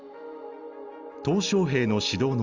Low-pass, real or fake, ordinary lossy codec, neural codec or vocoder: 7.2 kHz; real; Opus, 32 kbps; none